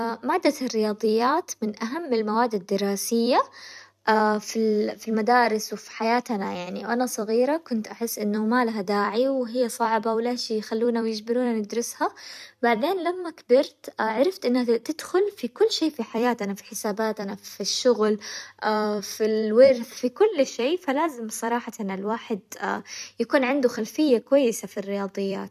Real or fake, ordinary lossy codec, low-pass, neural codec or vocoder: fake; none; 14.4 kHz; vocoder, 44.1 kHz, 128 mel bands every 256 samples, BigVGAN v2